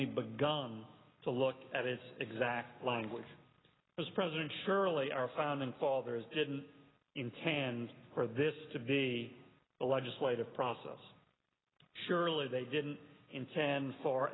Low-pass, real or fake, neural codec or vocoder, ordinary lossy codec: 7.2 kHz; real; none; AAC, 16 kbps